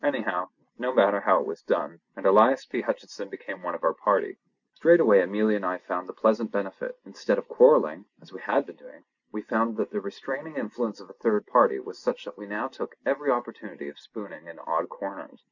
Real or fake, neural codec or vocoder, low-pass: real; none; 7.2 kHz